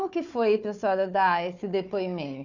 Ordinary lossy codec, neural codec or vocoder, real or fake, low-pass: none; codec, 16 kHz, 4 kbps, FunCodec, trained on Chinese and English, 50 frames a second; fake; 7.2 kHz